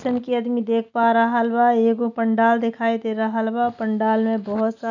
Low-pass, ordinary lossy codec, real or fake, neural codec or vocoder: 7.2 kHz; none; real; none